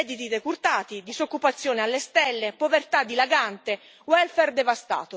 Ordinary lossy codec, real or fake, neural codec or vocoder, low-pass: none; real; none; none